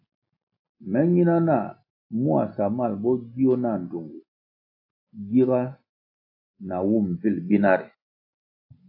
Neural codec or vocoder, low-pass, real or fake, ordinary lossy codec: none; 5.4 kHz; real; AAC, 48 kbps